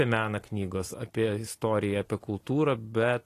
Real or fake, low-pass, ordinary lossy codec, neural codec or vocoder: real; 14.4 kHz; AAC, 48 kbps; none